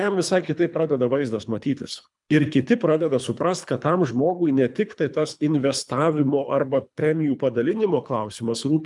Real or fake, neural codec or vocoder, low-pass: fake; codec, 24 kHz, 3 kbps, HILCodec; 10.8 kHz